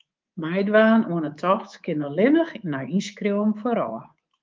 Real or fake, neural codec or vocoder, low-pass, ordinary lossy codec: fake; codec, 24 kHz, 3.1 kbps, DualCodec; 7.2 kHz; Opus, 24 kbps